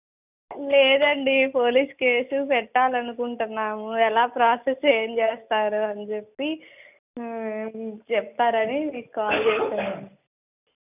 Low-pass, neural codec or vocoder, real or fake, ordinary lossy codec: 3.6 kHz; none; real; none